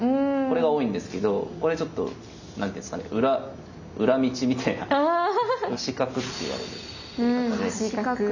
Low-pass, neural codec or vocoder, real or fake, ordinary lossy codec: 7.2 kHz; none; real; none